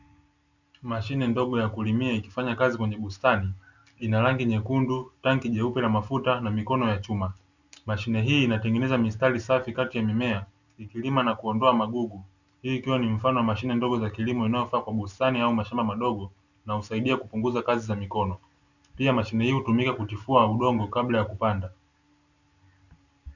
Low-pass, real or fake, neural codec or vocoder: 7.2 kHz; real; none